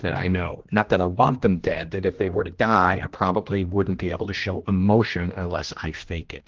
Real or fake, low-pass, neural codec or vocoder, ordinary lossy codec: fake; 7.2 kHz; codec, 16 kHz, 1 kbps, X-Codec, HuBERT features, trained on general audio; Opus, 16 kbps